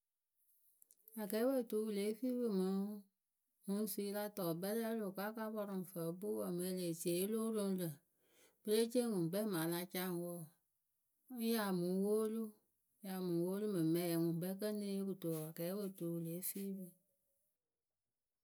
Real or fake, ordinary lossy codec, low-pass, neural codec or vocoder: real; none; none; none